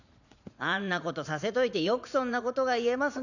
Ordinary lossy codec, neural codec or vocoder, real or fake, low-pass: none; vocoder, 44.1 kHz, 80 mel bands, Vocos; fake; 7.2 kHz